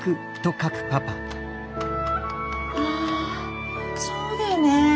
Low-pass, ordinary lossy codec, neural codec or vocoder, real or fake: none; none; none; real